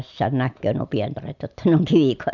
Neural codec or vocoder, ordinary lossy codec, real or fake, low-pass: none; none; real; 7.2 kHz